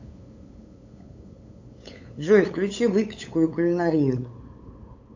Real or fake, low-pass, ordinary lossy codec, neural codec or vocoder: fake; 7.2 kHz; AAC, 48 kbps; codec, 16 kHz, 8 kbps, FunCodec, trained on LibriTTS, 25 frames a second